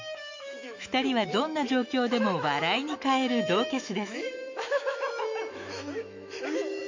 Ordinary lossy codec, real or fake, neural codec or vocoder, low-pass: MP3, 48 kbps; fake; autoencoder, 48 kHz, 128 numbers a frame, DAC-VAE, trained on Japanese speech; 7.2 kHz